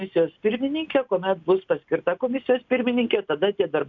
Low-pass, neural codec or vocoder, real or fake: 7.2 kHz; none; real